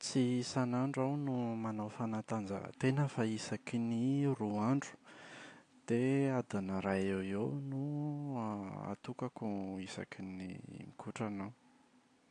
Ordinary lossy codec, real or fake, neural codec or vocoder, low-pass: AAC, 48 kbps; real; none; 9.9 kHz